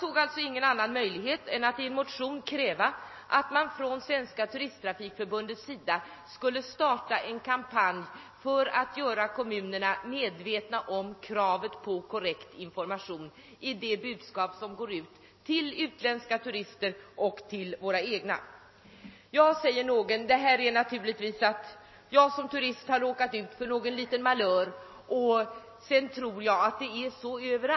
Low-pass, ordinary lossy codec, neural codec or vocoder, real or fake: 7.2 kHz; MP3, 24 kbps; none; real